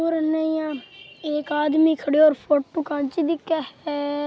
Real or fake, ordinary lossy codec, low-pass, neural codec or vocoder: real; none; none; none